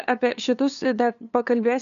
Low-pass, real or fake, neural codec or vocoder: 7.2 kHz; fake; codec, 16 kHz, 2 kbps, FunCodec, trained on LibriTTS, 25 frames a second